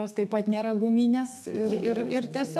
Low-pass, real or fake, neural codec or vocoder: 14.4 kHz; fake; codec, 32 kHz, 1.9 kbps, SNAC